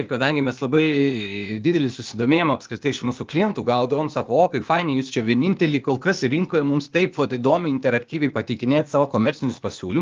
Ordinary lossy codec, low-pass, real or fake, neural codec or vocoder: Opus, 24 kbps; 7.2 kHz; fake; codec, 16 kHz, 0.8 kbps, ZipCodec